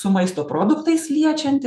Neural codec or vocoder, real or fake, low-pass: autoencoder, 48 kHz, 128 numbers a frame, DAC-VAE, trained on Japanese speech; fake; 14.4 kHz